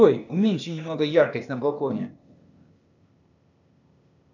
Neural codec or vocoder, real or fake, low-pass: codec, 16 kHz, 0.8 kbps, ZipCodec; fake; 7.2 kHz